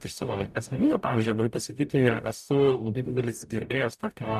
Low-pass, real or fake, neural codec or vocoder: 14.4 kHz; fake; codec, 44.1 kHz, 0.9 kbps, DAC